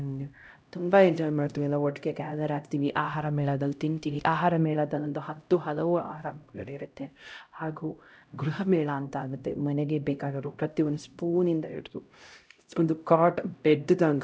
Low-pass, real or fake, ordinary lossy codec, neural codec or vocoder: none; fake; none; codec, 16 kHz, 0.5 kbps, X-Codec, HuBERT features, trained on LibriSpeech